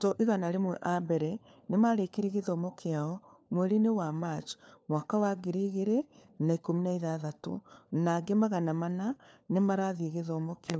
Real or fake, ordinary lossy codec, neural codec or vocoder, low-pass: fake; none; codec, 16 kHz, 4 kbps, FunCodec, trained on LibriTTS, 50 frames a second; none